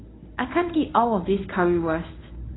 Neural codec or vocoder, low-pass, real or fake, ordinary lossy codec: codec, 24 kHz, 0.9 kbps, WavTokenizer, medium speech release version 1; 7.2 kHz; fake; AAC, 16 kbps